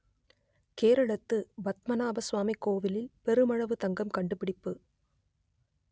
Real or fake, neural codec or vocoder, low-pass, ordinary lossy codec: real; none; none; none